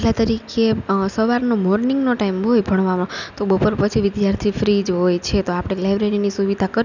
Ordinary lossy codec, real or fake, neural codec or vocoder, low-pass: none; real; none; 7.2 kHz